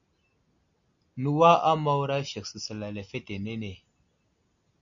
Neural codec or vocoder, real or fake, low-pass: none; real; 7.2 kHz